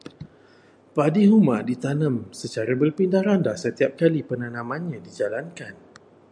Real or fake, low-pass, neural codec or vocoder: real; 9.9 kHz; none